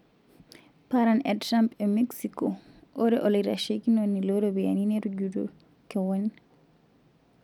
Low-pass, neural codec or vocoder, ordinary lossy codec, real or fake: 19.8 kHz; none; none; real